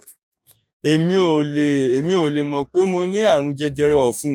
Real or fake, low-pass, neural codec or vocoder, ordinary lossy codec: fake; 19.8 kHz; codec, 44.1 kHz, 2.6 kbps, DAC; none